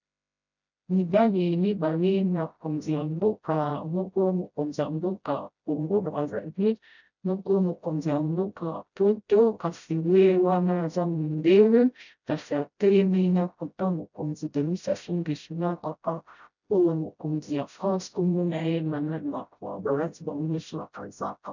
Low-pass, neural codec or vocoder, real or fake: 7.2 kHz; codec, 16 kHz, 0.5 kbps, FreqCodec, smaller model; fake